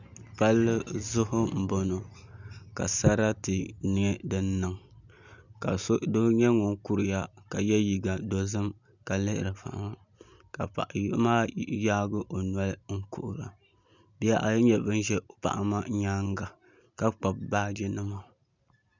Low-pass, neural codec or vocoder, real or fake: 7.2 kHz; none; real